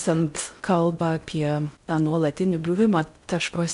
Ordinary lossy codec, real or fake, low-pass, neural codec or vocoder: MP3, 64 kbps; fake; 10.8 kHz; codec, 16 kHz in and 24 kHz out, 0.6 kbps, FocalCodec, streaming, 4096 codes